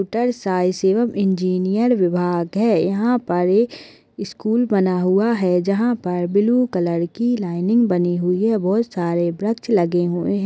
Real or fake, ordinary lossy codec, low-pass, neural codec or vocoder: real; none; none; none